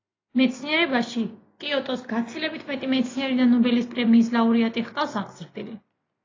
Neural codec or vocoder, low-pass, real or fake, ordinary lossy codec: none; 7.2 kHz; real; AAC, 32 kbps